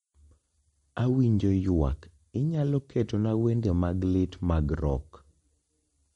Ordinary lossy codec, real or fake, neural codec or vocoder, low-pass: MP3, 48 kbps; real; none; 19.8 kHz